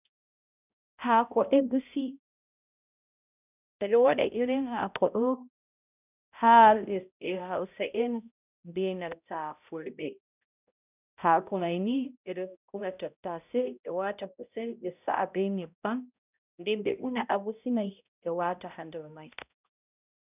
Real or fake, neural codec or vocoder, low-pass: fake; codec, 16 kHz, 0.5 kbps, X-Codec, HuBERT features, trained on balanced general audio; 3.6 kHz